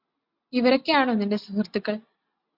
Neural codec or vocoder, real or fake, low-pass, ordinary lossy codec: none; real; 5.4 kHz; MP3, 48 kbps